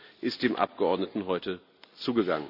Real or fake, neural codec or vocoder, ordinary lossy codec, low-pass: real; none; none; 5.4 kHz